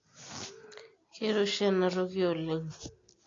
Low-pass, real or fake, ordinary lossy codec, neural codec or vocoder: 7.2 kHz; real; AAC, 32 kbps; none